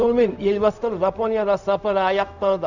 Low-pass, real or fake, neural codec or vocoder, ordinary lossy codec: 7.2 kHz; fake; codec, 16 kHz, 0.4 kbps, LongCat-Audio-Codec; none